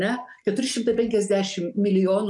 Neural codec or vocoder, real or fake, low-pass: none; real; 10.8 kHz